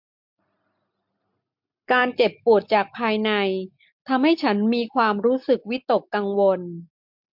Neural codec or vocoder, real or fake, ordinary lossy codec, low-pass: none; real; MP3, 48 kbps; 5.4 kHz